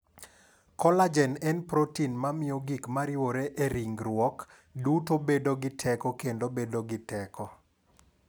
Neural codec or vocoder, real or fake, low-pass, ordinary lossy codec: none; real; none; none